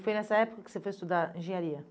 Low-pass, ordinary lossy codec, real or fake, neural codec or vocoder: none; none; real; none